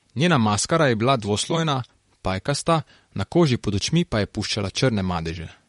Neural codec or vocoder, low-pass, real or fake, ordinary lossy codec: vocoder, 44.1 kHz, 128 mel bands, Pupu-Vocoder; 19.8 kHz; fake; MP3, 48 kbps